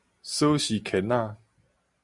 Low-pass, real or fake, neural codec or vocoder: 10.8 kHz; real; none